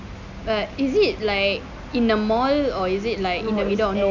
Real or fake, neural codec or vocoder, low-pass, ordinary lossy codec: real; none; 7.2 kHz; none